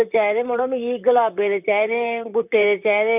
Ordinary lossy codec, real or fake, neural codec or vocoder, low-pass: none; fake; vocoder, 44.1 kHz, 128 mel bands, Pupu-Vocoder; 3.6 kHz